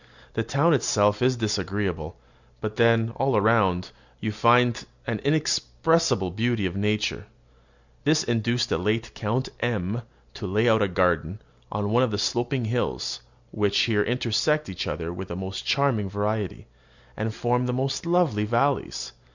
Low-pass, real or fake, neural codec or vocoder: 7.2 kHz; real; none